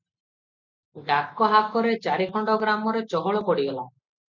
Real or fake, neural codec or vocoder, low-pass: real; none; 7.2 kHz